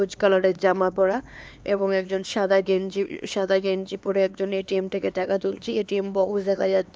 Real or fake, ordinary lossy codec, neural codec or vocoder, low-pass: fake; none; codec, 16 kHz, 2 kbps, X-Codec, HuBERT features, trained on LibriSpeech; none